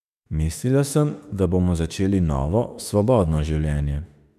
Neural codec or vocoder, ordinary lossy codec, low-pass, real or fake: autoencoder, 48 kHz, 32 numbers a frame, DAC-VAE, trained on Japanese speech; none; 14.4 kHz; fake